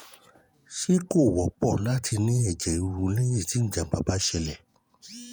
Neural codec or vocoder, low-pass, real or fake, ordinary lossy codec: vocoder, 48 kHz, 128 mel bands, Vocos; none; fake; none